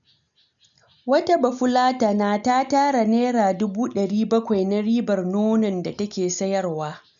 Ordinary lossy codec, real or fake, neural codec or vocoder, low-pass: none; real; none; 7.2 kHz